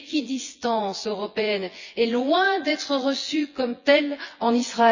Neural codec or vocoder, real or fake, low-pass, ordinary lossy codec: vocoder, 24 kHz, 100 mel bands, Vocos; fake; 7.2 kHz; Opus, 64 kbps